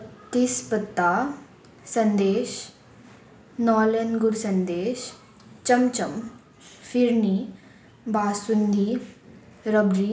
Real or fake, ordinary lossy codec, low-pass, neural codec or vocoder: real; none; none; none